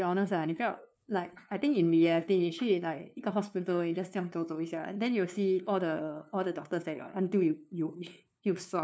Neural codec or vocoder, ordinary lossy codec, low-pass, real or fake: codec, 16 kHz, 2 kbps, FunCodec, trained on LibriTTS, 25 frames a second; none; none; fake